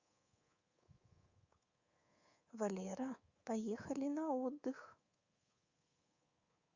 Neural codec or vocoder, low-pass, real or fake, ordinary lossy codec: codec, 24 kHz, 3.1 kbps, DualCodec; 7.2 kHz; fake; Opus, 64 kbps